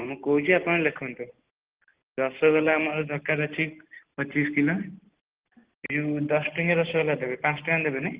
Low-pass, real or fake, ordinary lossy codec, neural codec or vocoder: 3.6 kHz; real; Opus, 16 kbps; none